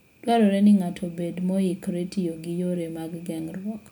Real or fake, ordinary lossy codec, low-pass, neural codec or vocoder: real; none; none; none